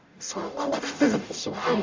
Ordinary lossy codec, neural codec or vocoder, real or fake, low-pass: MP3, 64 kbps; codec, 44.1 kHz, 0.9 kbps, DAC; fake; 7.2 kHz